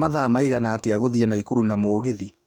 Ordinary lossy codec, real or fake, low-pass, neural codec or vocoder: none; fake; 19.8 kHz; codec, 44.1 kHz, 2.6 kbps, DAC